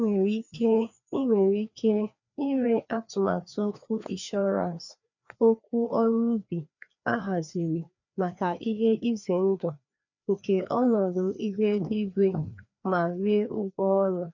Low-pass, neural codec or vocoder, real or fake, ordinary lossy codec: 7.2 kHz; codec, 16 kHz, 2 kbps, FreqCodec, larger model; fake; none